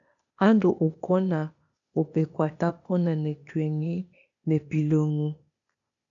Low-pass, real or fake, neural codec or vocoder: 7.2 kHz; fake; codec, 16 kHz, 0.8 kbps, ZipCodec